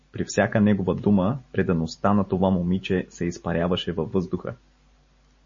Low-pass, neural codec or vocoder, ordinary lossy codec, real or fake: 7.2 kHz; none; MP3, 32 kbps; real